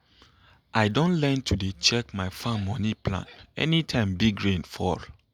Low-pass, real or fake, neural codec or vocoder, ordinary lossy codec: 19.8 kHz; real; none; none